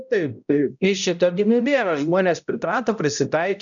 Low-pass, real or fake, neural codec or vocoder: 7.2 kHz; fake; codec, 16 kHz, 0.5 kbps, X-Codec, HuBERT features, trained on balanced general audio